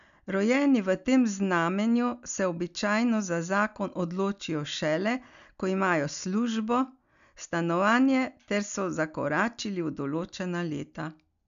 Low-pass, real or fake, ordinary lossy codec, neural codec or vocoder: 7.2 kHz; real; MP3, 96 kbps; none